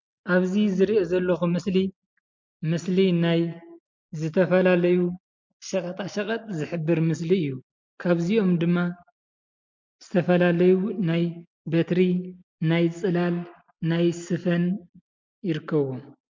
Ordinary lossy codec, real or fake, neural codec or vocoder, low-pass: MP3, 48 kbps; real; none; 7.2 kHz